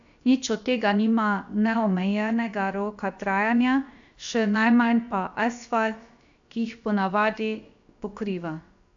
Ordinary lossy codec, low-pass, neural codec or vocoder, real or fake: AAC, 64 kbps; 7.2 kHz; codec, 16 kHz, about 1 kbps, DyCAST, with the encoder's durations; fake